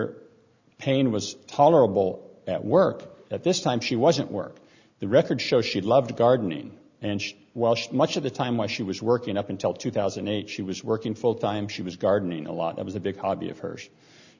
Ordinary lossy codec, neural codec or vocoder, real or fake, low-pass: Opus, 64 kbps; none; real; 7.2 kHz